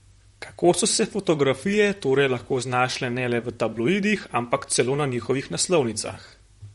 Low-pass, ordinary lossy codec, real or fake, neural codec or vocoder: 19.8 kHz; MP3, 48 kbps; fake; vocoder, 44.1 kHz, 128 mel bands, Pupu-Vocoder